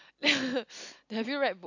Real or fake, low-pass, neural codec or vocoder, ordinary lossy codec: real; 7.2 kHz; none; none